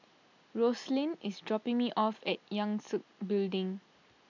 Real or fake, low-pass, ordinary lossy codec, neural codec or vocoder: real; 7.2 kHz; none; none